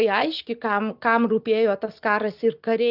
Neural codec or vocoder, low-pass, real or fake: none; 5.4 kHz; real